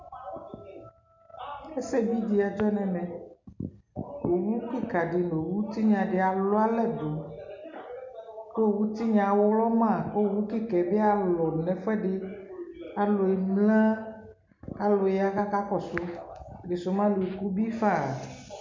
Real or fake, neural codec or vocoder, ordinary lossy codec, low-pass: real; none; MP3, 48 kbps; 7.2 kHz